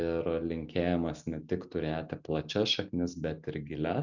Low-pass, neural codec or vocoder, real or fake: 7.2 kHz; none; real